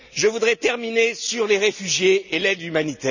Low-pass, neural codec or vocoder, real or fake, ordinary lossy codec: 7.2 kHz; none; real; none